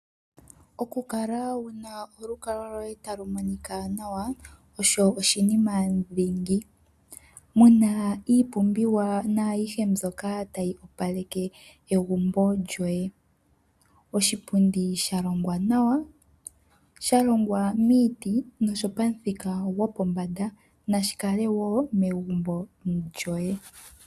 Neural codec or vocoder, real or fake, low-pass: none; real; 14.4 kHz